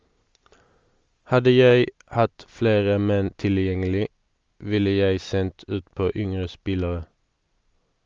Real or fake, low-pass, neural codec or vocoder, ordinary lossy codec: real; 7.2 kHz; none; Opus, 32 kbps